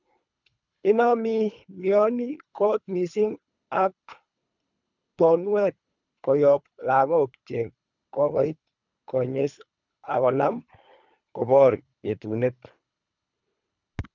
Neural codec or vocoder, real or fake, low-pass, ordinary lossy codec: codec, 24 kHz, 3 kbps, HILCodec; fake; 7.2 kHz; none